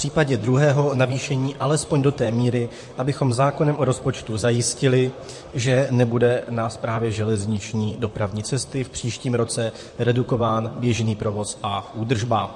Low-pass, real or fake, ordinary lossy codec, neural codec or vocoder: 10.8 kHz; fake; MP3, 48 kbps; vocoder, 44.1 kHz, 128 mel bands, Pupu-Vocoder